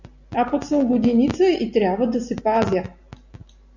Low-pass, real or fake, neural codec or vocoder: 7.2 kHz; real; none